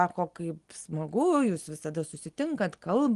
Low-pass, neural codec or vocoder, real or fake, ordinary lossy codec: 10.8 kHz; none; real; Opus, 24 kbps